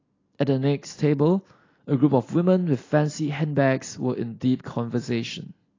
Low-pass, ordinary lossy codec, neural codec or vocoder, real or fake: 7.2 kHz; AAC, 32 kbps; none; real